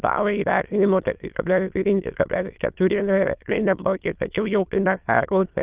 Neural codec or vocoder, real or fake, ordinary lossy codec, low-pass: autoencoder, 22.05 kHz, a latent of 192 numbers a frame, VITS, trained on many speakers; fake; Opus, 32 kbps; 3.6 kHz